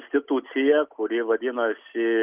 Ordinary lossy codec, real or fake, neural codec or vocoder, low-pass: Opus, 32 kbps; real; none; 3.6 kHz